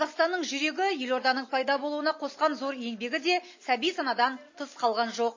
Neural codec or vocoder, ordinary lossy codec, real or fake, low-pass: none; MP3, 32 kbps; real; 7.2 kHz